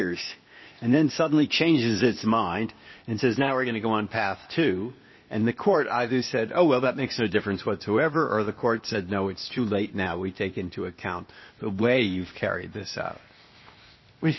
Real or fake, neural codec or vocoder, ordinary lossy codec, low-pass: fake; codec, 16 kHz, 0.8 kbps, ZipCodec; MP3, 24 kbps; 7.2 kHz